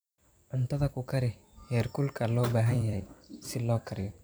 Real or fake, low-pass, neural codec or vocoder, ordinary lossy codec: fake; none; vocoder, 44.1 kHz, 128 mel bands every 256 samples, BigVGAN v2; none